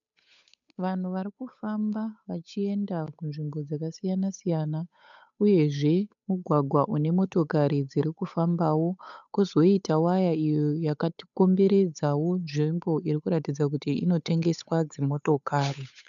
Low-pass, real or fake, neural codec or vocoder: 7.2 kHz; fake; codec, 16 kHz, 8 kbps, FunCodec, trained on Chinese and English, 25 frames a second